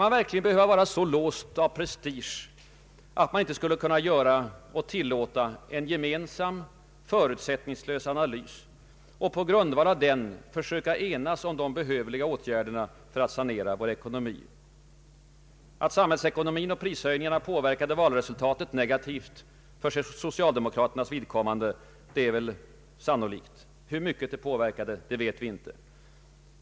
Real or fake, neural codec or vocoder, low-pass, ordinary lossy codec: real; none; none; none